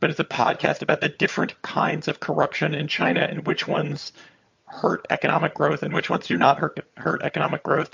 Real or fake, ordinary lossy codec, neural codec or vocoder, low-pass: fake; MP3, 48 kbps; vocoder, 22.05 kHz, 80 mel bands, HiFi-GAN; 7.2 kHz